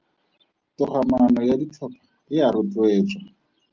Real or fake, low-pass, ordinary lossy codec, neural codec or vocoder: real; 7.2 kHz; Opus, 24 kbps; none